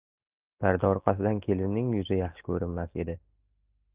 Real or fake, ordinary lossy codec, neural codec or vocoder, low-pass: real; Opus, 24 kbps; none; 3.6 kHz